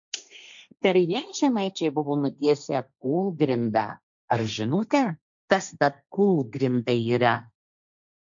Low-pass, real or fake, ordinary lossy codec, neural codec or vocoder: 7.2 kHz; fake; MP3, 64 kbps; codec, 16 kHz, 1.1 kbps, Voila-Tokenizer